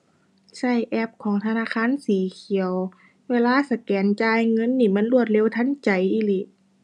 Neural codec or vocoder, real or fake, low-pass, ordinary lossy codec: none; real; none; none